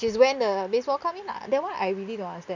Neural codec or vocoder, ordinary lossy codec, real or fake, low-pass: none; none; real; 7.2 kHz